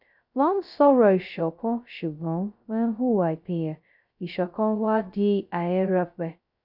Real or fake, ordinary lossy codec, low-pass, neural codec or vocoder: fake; none; 5.4 kHz; codec, 16 kHz, 0.2 kbps, FocalCodec